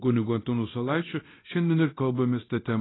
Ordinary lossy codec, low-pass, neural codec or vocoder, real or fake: AAC, 16 kbps; 7.2 kHz; codec, 24 kHz, 0.9 kbps, DualCodec; fake